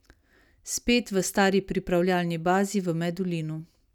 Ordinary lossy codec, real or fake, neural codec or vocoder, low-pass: none; real; none; 19.8 kHz